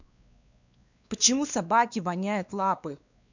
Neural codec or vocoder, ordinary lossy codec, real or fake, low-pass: codec, 16 kHz, 2 kbps, X-Codec, WavLM features, trained on Multilingual LibriSpeech; none; fake; 7.2 kHz